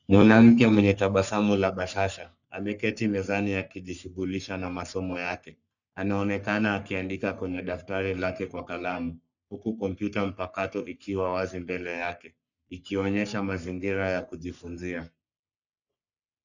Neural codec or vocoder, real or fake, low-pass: codec, 44.1 kHz, 3.4 kbps, Pupu-Codec; fake; 7.2 kHz